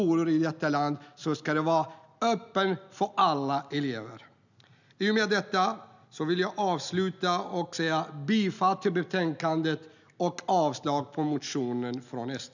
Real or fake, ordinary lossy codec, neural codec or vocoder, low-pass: real; none; none; 7.2 kHz